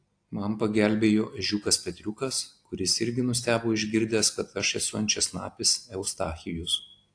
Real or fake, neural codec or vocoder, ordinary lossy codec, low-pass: real; none; AAC, 48 kbps; 9.9 kHz